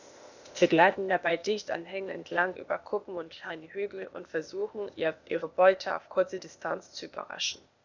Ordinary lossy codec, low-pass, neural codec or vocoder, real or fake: none; 7.2 kHz; codec, 16 kHz, 0.8 kbps, ZipCodec; fake